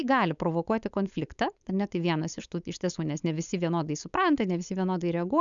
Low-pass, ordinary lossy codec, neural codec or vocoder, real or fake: 7.2 kHz; MP3, 96 kbps; codec, 16 kHz, 4.8 kbps, FACodec; fake